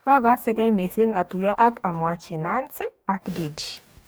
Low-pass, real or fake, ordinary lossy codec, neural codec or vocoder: none; fake; none; codec, 44.1 kHz, 2.6 kbps, DAC